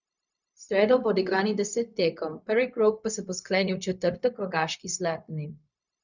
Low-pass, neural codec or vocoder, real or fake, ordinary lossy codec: 7.2 kHz; codec, 16 kHz, 0.4 kbps, LongCat-Audio-Codec; fake; none